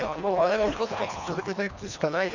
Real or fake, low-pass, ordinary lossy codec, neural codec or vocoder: fake; 7.2 kHz; none; codec, 24 kHz, 1.5 kbps, HILCodec